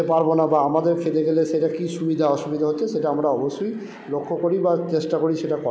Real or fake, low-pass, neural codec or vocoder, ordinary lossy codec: real; none; none; none